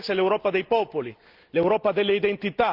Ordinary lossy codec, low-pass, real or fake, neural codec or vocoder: Opus, 32 kbps; 5.4 kHz; real; none